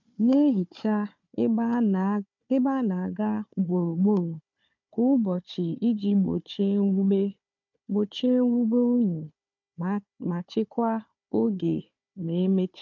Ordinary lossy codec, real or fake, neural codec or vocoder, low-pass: MP3, 48 kbps; fake; codec, 16 kHz, 4 kbps, FunCodec, trained on Chinese and English, 50 frames a second; 7.2 kHz